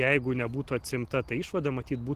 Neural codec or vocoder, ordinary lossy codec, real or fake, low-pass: none; Opus, 16 kbps; real; 14.4 kHz